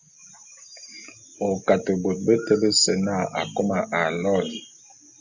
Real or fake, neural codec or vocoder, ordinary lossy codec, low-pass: real; none; Opus, 64 kbps; 7.2 kHz